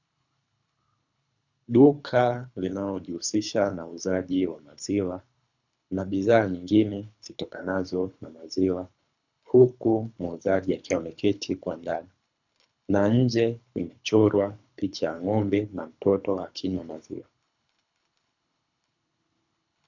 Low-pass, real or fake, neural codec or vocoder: 7.2 kHz; fake; codec, 24 kHz, 3 kbps, HILCodec